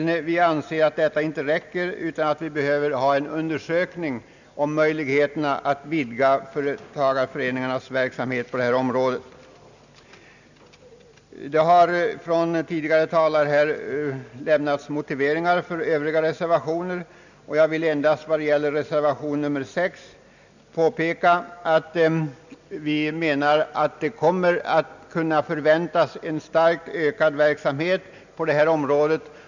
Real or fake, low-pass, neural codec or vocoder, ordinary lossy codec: real; 7.2 kHz; none; none